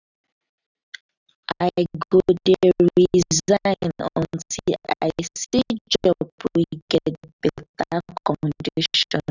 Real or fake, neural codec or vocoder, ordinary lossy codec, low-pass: real; none; none; 7.2 kHz